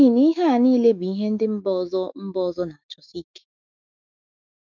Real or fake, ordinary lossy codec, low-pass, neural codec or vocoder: fake; none; 7.2 kHz; autoencoder, 48 kHz, 128 numbers a frame, DAC-VAE, trained on Japanese speech